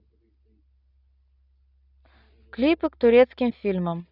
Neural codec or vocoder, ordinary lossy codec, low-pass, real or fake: none; none; 5.4 kHz; real